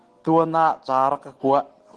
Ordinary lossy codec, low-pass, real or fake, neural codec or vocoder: Opus, 16 kbps; 10.8 kHz; real; none